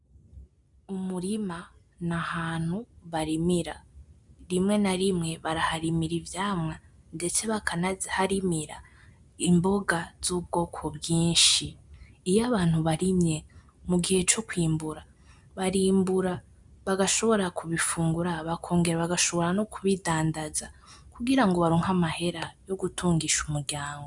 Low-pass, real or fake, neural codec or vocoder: 10.8 kHz; real; none